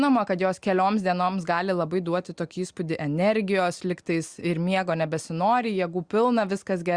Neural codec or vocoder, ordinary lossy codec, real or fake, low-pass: none; Opus, 64 kbps; real; 9.9 kHz